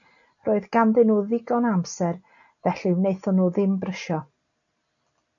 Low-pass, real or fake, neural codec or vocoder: 7.2 kHz; real; none